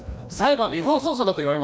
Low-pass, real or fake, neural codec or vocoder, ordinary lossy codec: none; fake; codec, 16 kHz, 1 kbps, FreqCodec, larger model; none